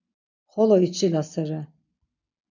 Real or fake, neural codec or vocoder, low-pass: real; none; 7.2 kHz